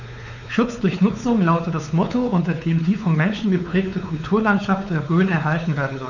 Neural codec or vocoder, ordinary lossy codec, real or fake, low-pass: codec, 16 kHz, 4 kbps, X-Codec, WavLM features, trained on Multilingual LibriSpeech; none; fake; 7.2 kHz